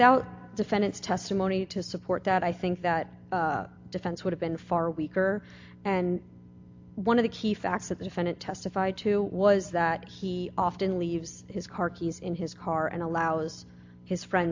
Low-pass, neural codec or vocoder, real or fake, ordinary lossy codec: 7.2 kHz; none; real; AAC, 48 kbps